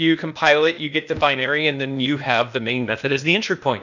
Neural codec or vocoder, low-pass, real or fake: codec, 16 kHz, 0.8 kbps, ZipCodec; 7.2 kHz; fake